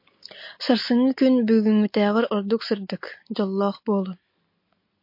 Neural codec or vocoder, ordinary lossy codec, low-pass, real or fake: none; MP3, 32 kbps; 5.4 kHz; real